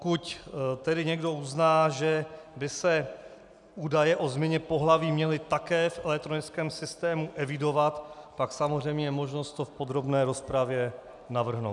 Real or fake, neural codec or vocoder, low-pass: real; none; 10.8 kHz